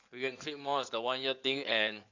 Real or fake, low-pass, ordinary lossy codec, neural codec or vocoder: fake; 7.2 kHz; none; codec, 16 kHz in and 24 kHz out, 2.2 kbps, FireRedTTS-2 codec